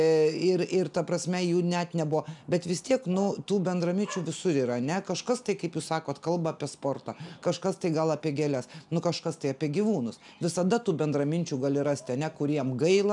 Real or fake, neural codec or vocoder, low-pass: real; none; 10.8 kHz